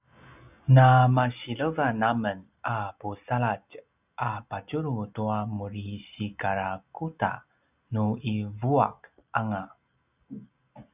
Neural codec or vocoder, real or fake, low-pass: none; real; 3.6 kHz